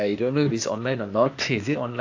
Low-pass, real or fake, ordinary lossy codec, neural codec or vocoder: 7.2 kHz; fake; AAC, 48 kbps; codec, 16 kHz, 0.8 kbps, ZipCodec